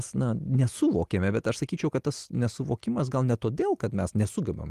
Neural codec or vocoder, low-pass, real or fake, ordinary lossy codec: none; 14.4 kHz; real; Opus, 24 kbps